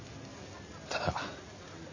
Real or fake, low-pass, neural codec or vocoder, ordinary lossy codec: real; 7.2 kHz; none; AAC, 48 kbps